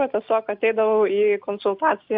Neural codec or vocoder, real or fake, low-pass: none; real; 5.4 kHz